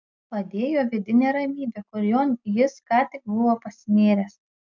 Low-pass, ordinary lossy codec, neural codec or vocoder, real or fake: 7.2 kHz; MP3, 64 kbps; none; real